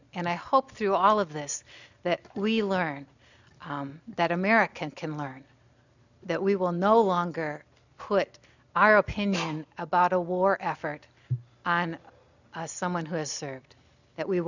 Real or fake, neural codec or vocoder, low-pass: real; none; 7.2 kHz